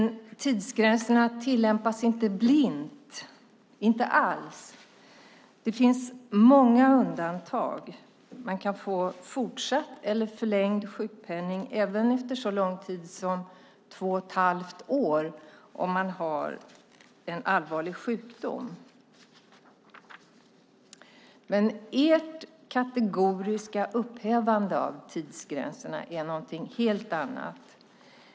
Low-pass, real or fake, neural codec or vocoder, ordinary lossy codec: none; real; none; none